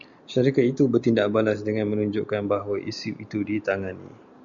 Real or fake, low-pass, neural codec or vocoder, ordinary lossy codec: real; 7.2 kHz; none; Opus, 64 kbps